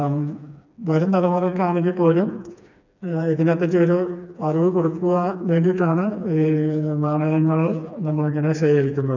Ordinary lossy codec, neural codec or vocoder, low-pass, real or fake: none; codec, 16 kHz, 2 kbps, FreqCodec, smaller model; 7.2 kHz; fake